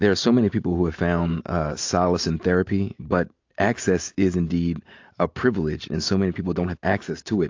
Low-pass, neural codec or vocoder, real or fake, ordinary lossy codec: 7.2 kHz; none; real; AAC, 48 kbps